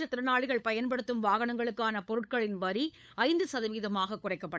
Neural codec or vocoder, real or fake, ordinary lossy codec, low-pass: codec, 16 kHz, 8 kbps, FunCodec, trained on LibriTTS, 25 frames a second; fake; none; none